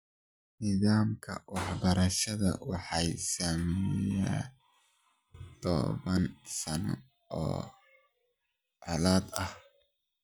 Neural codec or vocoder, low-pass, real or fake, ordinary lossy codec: none; none; real; none